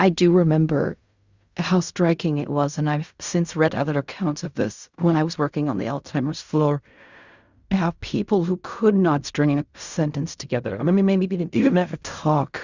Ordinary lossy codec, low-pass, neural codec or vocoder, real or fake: Opus, 64 kbps; 7.2 kHz; codec, 16 kHz in and 24 kHz out, 0.4 kbps, LongCat-Audio-Codec, fine tuned four codebook decoder; fake